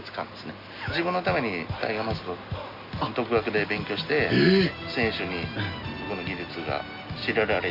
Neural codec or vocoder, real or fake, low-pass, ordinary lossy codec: none; real; 5.4 kHz; Opus, 64 kbps